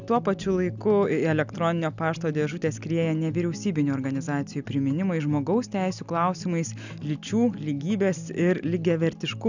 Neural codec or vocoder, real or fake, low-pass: none; real; 7.2 kHz